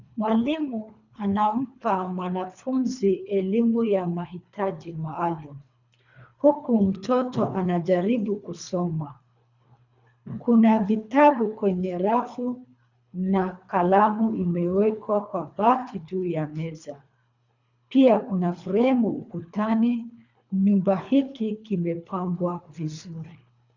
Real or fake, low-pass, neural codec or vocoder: fake; 7.2 kHz; codec, 24 kHz, 3 kbps, HILCodec